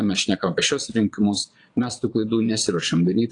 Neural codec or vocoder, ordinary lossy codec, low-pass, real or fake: vocoder, 22.05 kHz, 80 mel bands, Vocos; AAC, 48 kbps; 9.9 kHz; fake